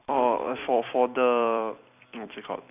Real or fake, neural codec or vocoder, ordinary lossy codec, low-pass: fake; vocoder, 44.1 kHz, 128 mel bands every 256 samples, BigVGAN v2; none; 3.6 kHz